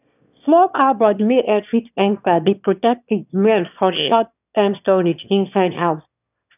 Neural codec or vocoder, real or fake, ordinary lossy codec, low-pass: autoencoder, 22.05 kHz, a latent of 192 numbers a frame, VITS, trained on one speaker; fake; none; 3.6 kHz